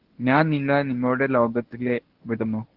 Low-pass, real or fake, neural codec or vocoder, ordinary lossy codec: 5.4 kHz; fake; codec, 24 kHz, 0.9 kbps, WavTokenizer, medium speech release version 1; Opus, 16 kbps